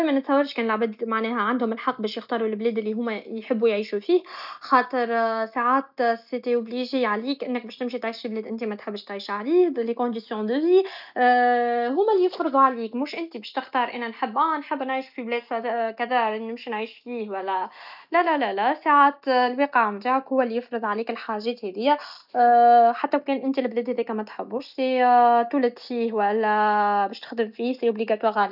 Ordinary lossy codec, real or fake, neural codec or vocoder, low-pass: none; real; none; 5.4 kHz